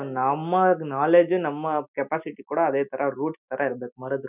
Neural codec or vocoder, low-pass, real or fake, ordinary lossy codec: none; 3.6 kHz; real; none